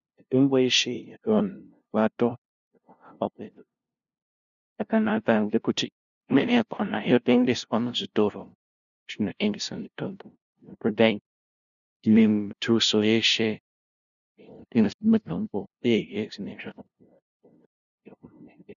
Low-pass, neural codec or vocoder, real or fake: 7.2 kHz; codec, 16 kHz, 0.5 kbps, FunCodec, trained on LibriTTS, 25 frames a second; fake